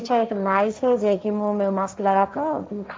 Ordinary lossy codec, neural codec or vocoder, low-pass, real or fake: none; codec, 16 kHz, 1.1 kbps, Voila-Tokenizer; none; fake